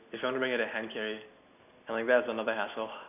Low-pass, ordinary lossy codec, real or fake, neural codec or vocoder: 3.6 kHz; none; real; none